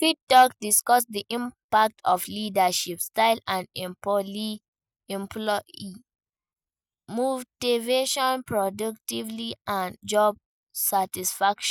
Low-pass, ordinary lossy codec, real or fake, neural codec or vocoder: none; none; real; none